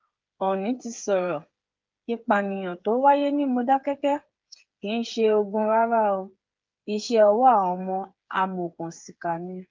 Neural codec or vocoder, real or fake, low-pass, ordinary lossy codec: codec, 16 kHz, 8 kbps, FreqCodec, smaller model; fake; 7.2 kHz; Opus, 32 kbps